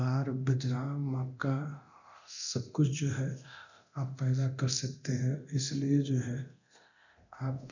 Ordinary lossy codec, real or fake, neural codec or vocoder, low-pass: none; fake; codec, 24 kHz, 0.9 kbps, DualCodec; 7.2 kHz